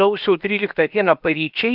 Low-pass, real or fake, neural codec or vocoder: 5.4 kHz; fake; codec, 16 kHz, 0.7 kbps, FocalCodec